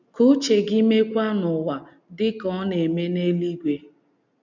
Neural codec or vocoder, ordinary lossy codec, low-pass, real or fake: none; none; 7.2 kHz; real